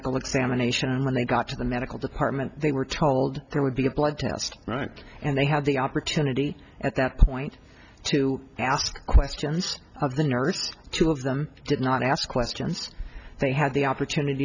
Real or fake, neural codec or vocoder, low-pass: real; none; 7.2 kHz